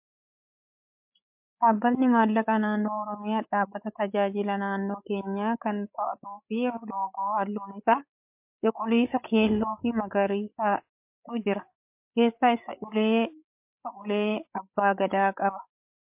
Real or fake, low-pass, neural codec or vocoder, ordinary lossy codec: fake; 3.6 kHz; codec, 16 kHz, 8 kbps, FreqCodec, larger model; MP3, 32 kbps